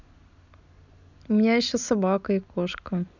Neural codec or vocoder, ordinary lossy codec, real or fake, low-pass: none; none; real; 7.2 kHz